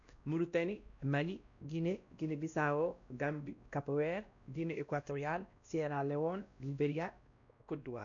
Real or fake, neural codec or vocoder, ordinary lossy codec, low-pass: fake; codec, 16 kHz, 1 kbps, X-Codec, WavLM features, trained on Multilingual LibriSpeech; none; 7.2 kHz